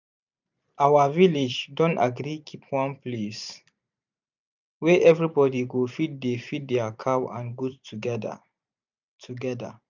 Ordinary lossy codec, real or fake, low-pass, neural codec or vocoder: none; fake; 7.2 kHz; vocoder, 44.1 kHz, 128 mel bands every 512 samples, BigVGAN v2